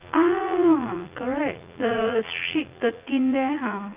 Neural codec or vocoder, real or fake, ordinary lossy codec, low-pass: vocoder, 44.1 kHz, 80 mel bands, Vocos; fake; Opus, 24 kbps; 3.6 kHz